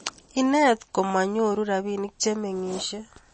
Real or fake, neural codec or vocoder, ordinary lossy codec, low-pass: real; none; MP3, 32 kbps; 10.8 kHz